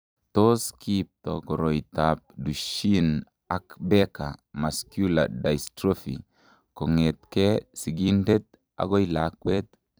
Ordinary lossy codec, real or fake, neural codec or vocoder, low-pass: none; fake; vocoder, 44.1 kHz, 128 mel bands every 256 samples, BigVGAN v2; none